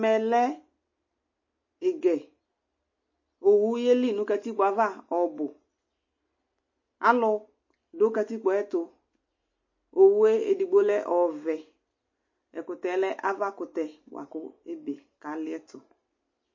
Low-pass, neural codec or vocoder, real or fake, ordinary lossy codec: 7.2 kHz; none; real; MP3, 32 kbps